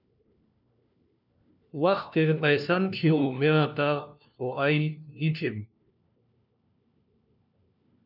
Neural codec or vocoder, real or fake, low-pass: codec, 16 kHz, 1 kbps, FunCodec, trained on LibriTTS, 50 frames a second; fake; 5.4 kHz